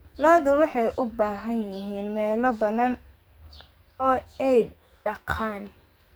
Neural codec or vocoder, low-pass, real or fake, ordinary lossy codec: codec, 44.1 kHz, 2.6 kbps, SNAC; none; fake; none